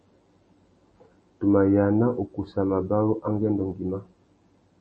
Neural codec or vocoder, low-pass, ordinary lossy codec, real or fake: none; 10.8 kHz; MP3, 32 kbps; real